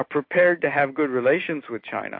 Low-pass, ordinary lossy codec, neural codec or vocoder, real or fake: 5.4 kHz; MP3, 32 kbps; none; real